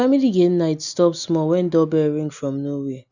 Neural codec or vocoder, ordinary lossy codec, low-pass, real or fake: none; none; 7.2 kHz; real